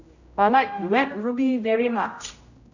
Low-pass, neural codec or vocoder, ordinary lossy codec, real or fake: 7.2 kHz; codec, 16 kHz, 0.5 kbps, X-Codec, HuBERT features, trained on general audio; none; fake